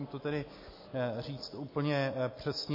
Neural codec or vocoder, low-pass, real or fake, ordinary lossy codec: none; 5.4 kHz; real; MP3, 24 kbps